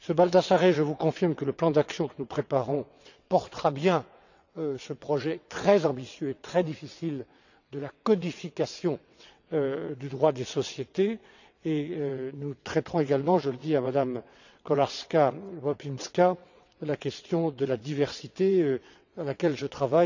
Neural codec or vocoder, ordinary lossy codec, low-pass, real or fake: vocoder, 22.05 kHz, 80 mel bands, WaveNeXt; none; 7.2 kHz; fake